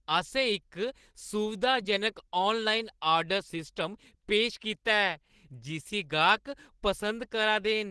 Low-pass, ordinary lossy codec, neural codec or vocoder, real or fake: 10.8 kHz; Opus, 16 kbps; none; real